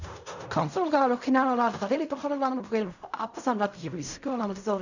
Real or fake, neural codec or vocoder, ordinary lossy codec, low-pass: fake; codec, 16 kHz in and 24 kHz out, 0.4 kbps, LongCat-Audio-Codec, fine tuned four codebook decoder; none; 7.2 kHz